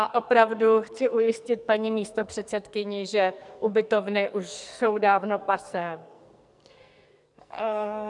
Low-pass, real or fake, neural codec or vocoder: 10.8 kHz; fake; codec, 44.1 kHz, 2.6 kbps, SNAC